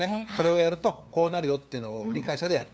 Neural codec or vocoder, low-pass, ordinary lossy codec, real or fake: codec, 16 kHz, 2 kbps, FunCodec, trained on LibriTTS, 25 frames a second; none; none; fake